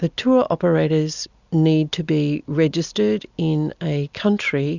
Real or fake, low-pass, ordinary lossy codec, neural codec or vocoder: real; 7.2 kHz; Opus, 64 kbps; none